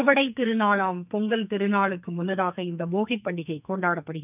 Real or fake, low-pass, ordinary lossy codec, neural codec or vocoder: fake; 3.6 kHz; none; codec, 44.1 kHz, 2.6 kbps, SNAC